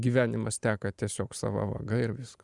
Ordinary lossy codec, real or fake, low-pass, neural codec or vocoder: Opus, 64 kbps; fake; 10.8 kHz; vocoder, 44.1 kHz, 128 mel bands every 512 samples, BigVGAN v2